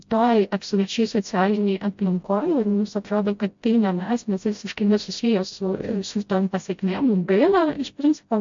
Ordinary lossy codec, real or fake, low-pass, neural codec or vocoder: MP3, 48 kbps; fake; 7.2 kHz; codec, 16 kHz, 0.5 kbps, FreqCodec, smaller model